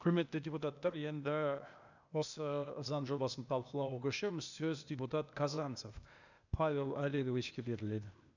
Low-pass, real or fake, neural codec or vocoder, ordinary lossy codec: 7.2 kHz; fake; codec, 16 kHz, 0.8 kbps, ZipCodec; none